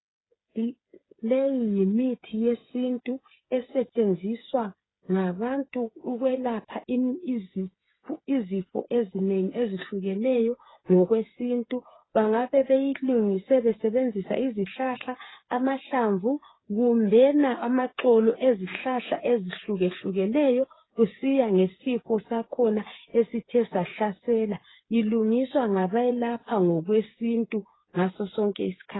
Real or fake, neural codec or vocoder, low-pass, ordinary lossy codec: fake; codec, 16 kHz, 8 kbps, FreqCodec, smaller model; 7.2 kHz; AAC, 16 kbps